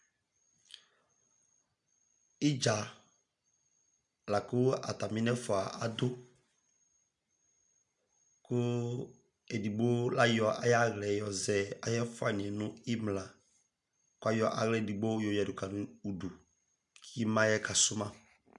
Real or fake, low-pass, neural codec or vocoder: real; 10.8 kHz; none